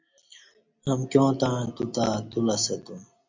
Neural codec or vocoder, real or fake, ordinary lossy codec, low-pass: none; real; MP3, 64 kbps; 7.2 kHz